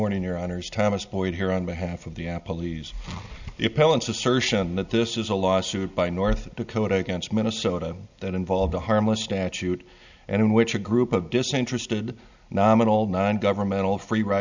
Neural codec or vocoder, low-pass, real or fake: none; 7.2 kHz; real